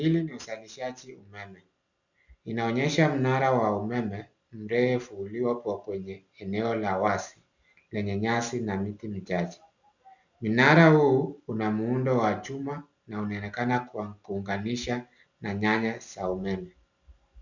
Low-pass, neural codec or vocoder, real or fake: 7.2 kHz; none; real